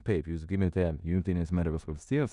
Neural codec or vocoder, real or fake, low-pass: codec, 16 kHz in and 24 kHz out, 0.9 kbps, LongCat-Audio-Codec, four codebook decoder; fake; 10.8 kHz